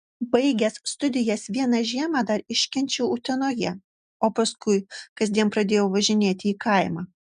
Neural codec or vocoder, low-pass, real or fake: none; 9.9 kHz; real